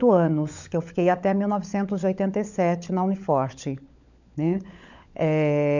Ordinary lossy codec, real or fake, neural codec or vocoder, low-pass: none; fake; codec, 16 kHz, 16 kbps, FunCodec, trained on LibriTTS, 50 frames a second; 7.2 kHz